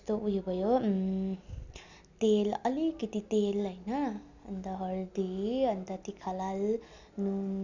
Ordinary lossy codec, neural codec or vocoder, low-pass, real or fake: none; none; 7.2 kHz; real